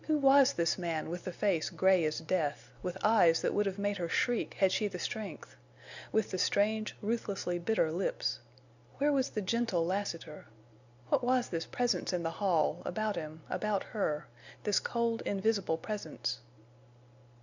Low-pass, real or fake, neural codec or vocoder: 7.2 kHz; real; none